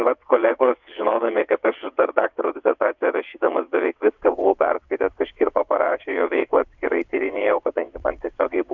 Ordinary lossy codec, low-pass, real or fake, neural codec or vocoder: MP3, 64 kbps; 7.2 kHz; fake; vocoder, 22.05 kHz, 80 mel bands, WaveNeXt